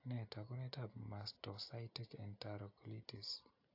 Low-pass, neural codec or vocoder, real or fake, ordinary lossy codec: 5.4 kHz; none; real; AAC, 48 kbps